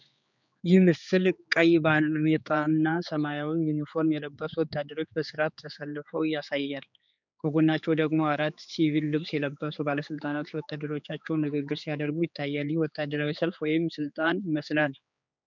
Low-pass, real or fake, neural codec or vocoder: 7.2 kHz; fake; codec, 16 kHz, 4 kbps, X-Codec, HuBERT features, trained on general audio